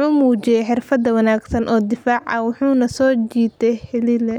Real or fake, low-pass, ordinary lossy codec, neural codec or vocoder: real; 19.8 kHz; none; none